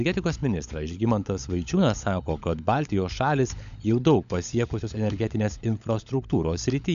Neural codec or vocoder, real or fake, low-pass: codec, 16 kHz, 16 kbps, FunCodec, trained on LibriTTS, 50 frames a second; fake; 7.2 kHz